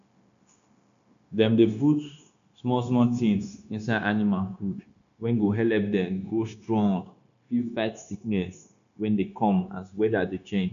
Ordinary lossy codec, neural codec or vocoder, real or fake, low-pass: none; codec, 16 kHz, 0.9 kbps, LongCat-Audio-Codec; fake; 7.2 kHz